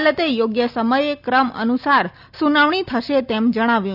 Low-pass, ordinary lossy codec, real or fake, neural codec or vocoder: 5.4 kHz; none; real; none